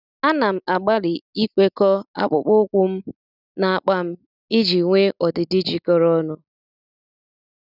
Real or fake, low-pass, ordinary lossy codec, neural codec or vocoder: real; 5.4 kHz; none; none